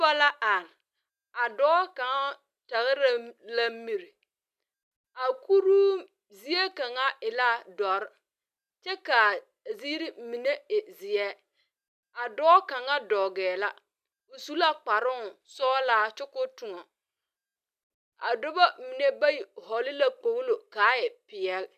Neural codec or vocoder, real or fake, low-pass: none; real; 14.4 kHz